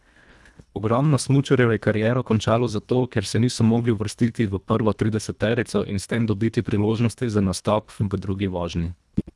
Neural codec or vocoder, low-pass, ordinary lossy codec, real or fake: codec, 24 kHz, 1.5 kbps, HILCodec; none; none; fake